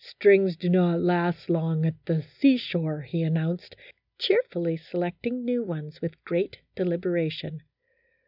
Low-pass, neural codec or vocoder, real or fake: 5.4 kHz; none; real